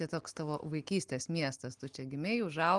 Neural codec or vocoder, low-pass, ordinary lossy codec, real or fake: none; 10.8 kHz; Opus, 24 kbps; real